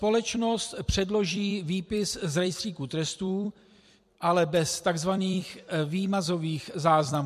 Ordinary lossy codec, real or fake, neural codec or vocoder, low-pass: MP3, 64 kbps; fake; vocoder, 44.1 kHz, 128 mel bands every 256 samples, BigVGAN v2; 14.4 kHz